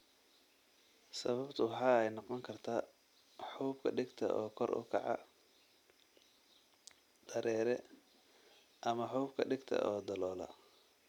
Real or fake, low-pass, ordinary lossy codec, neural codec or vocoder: real; 19.8 kHz; none; none